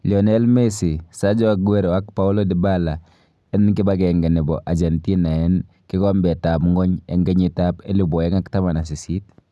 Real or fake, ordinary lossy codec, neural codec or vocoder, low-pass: real; none; none; none